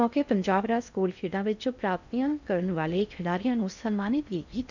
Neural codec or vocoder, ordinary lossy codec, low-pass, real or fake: codec, 16 kHz in and 24 kHz out, 0.6 kbps, FocalCodec, streaming, 4096 codes; none; 7.2 kHz; fake